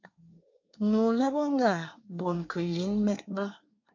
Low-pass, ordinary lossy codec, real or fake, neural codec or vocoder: 7.2 kHz; MP3, 48 kbps; fake; codec, 24 kHz, 1 kbps, SNAC